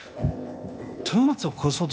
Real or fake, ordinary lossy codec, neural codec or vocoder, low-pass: fake; none; codec, 16 kHz, 0.8 kbps, ZipCodec; none